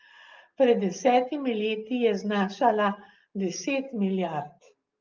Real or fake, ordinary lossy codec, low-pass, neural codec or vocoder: real; Opus, 32 kbps; 7.2 kHz; none